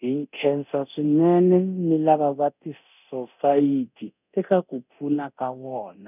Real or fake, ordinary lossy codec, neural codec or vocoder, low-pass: fake; none; codec, 24 kHz, 0.9 kbps, DualCodec; 3.6 kHz